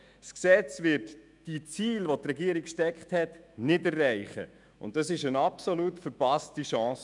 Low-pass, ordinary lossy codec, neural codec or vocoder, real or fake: 10.8 kHz; none; autoencoder, 48 kHz, 128 numbers a frame, DAC-VAE, trained on Japanese speech; fake